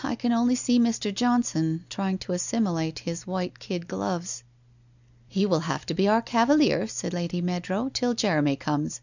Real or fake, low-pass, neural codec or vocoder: real; 7.2 kHz; none